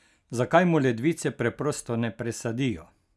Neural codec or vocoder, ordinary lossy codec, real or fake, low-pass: none; none; real; none